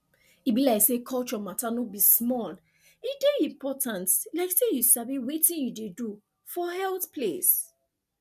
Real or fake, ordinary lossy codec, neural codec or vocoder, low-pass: real; none; none; 14.4 kHz